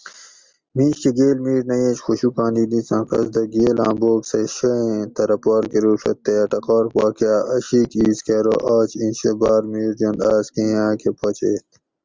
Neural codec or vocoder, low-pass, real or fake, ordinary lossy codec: none; 7.2 kHz; real; Opus, 32 kbps